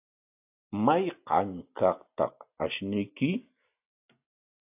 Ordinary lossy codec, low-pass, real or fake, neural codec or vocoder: AAC, 32 kbps; 3.6 kHz; real; none